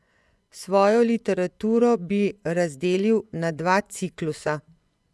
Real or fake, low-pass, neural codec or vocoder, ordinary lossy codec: fake; none; vocoder, 24 kHz, 100 mel bands, Vocos; none